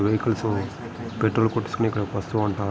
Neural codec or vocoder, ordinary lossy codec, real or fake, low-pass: none; none; real; none